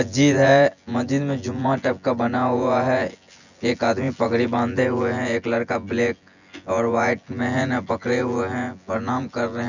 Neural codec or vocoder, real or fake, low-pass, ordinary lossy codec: vocoder, 24 kHz, 100 mel bands, Vocos; fake; 7.2 kHz; none